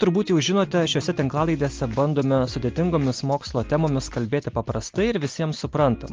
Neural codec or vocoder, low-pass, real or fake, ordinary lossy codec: none; 7.2 kHz; real; Opus, 32 kbps